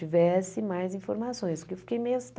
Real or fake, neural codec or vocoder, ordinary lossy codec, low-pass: real; none; none; none